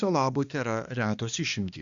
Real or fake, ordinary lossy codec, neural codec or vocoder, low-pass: fake; Opus, 64 kbps; codec, 16 kHz, 2 kbps, X-Codec, HuBERT features, trained on balanced general audio; 7.2 kHz